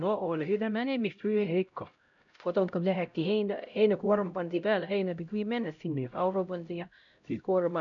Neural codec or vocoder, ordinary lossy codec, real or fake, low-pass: codec, 16 kHz, 0.5 kbps, X-Codec, HuBERT features, trained on LibriSpeech; none; fake; 7.2 kHz